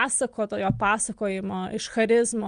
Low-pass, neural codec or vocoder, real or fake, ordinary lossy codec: 9.9 kHz; none; real; Opus, 32 kbps